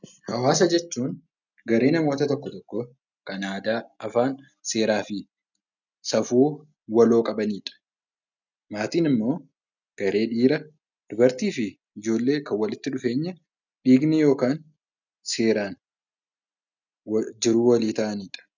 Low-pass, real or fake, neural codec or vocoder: 7.2 kHz; real; none